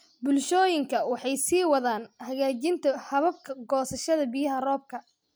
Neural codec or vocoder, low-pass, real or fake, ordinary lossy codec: none; none; real; none